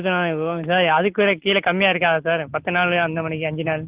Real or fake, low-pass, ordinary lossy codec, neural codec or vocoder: real; 3.6 kHz; Opus, 16 kbps; none